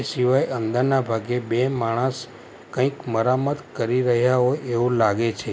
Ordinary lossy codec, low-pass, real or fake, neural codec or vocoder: none; none; real; none